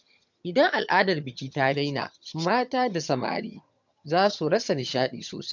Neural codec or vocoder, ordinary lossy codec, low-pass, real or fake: vocoder, 22.05 kHz, 80 mel bands, HiFi-GAN; MP3, 64 kbps; 7.2 kHz; fake